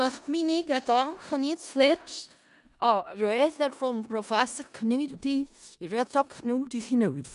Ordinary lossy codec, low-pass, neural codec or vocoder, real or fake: none; 10.8 kHz; codec, 16 kHz in and 24 kHz out, 0.4 kbps, LongCat-Audio-Codec, four codebook decoder; fake